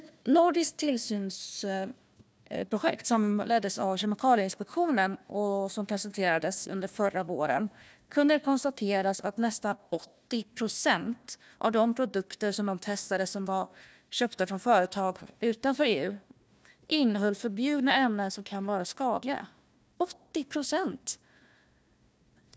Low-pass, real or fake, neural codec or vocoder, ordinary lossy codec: none; fake; codec, 16 kHz, 1 kbps, FunCodec, trained on Chinese and English, 50 frames a second; none